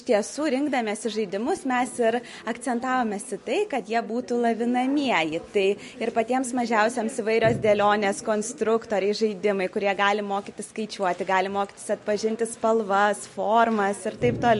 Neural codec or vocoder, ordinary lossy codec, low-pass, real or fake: none; MP3, 48 kbps; 14.4 kHz; real